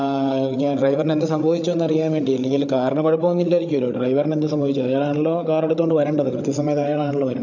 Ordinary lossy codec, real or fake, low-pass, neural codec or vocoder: none; fake; 7.2 kHz; codec, 16 kHz, 8 kbps, FreqCodec, larger model